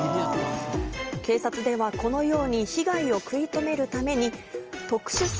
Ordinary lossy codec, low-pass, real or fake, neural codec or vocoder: Opus, 24 kbps; 7.2 kHz; real; none